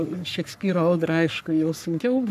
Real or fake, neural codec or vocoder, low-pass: fake; codec, 44.1 kHz, 3.4 kbps, Pupu-Codec; 14.4 kHz